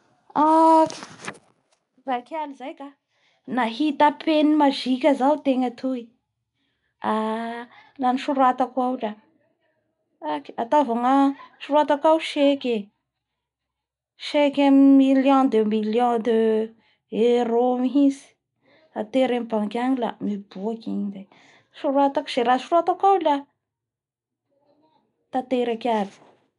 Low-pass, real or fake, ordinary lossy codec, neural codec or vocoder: 10.8 kHz; real; none; none